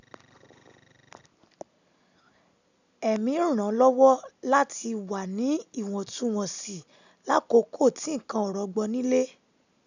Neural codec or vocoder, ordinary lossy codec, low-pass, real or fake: none; none; 7.2 kHz; real